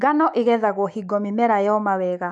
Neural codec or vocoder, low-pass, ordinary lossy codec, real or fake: autoencoder, 48 kHz, 128 numbers a frame, DAC-VAE, trained on Japanese speech; 10.8 kHz; none; fake